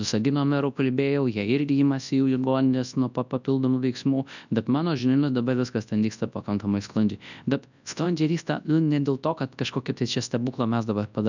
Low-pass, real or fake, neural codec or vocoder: 7.2 kHz; fake; codec, 24 kHz, 0.9 kbps, WavTokenizer, large speech release